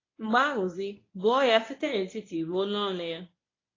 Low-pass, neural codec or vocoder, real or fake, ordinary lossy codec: 7.2 kHz; codec, 24 kHz, 0.9 kbps, WavTokenizer, medium speech release version 1; fake; AAC, 32 kbps